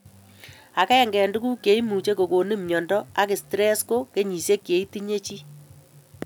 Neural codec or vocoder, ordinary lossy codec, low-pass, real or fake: none; none; none; real